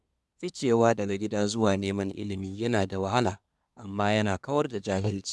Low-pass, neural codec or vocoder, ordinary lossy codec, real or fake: none; codec, 24 kHz, 1 kbps, SNAC; none; fake